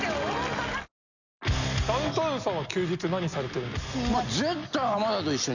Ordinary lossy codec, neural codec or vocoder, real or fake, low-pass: none; none; real; 7.2 kHz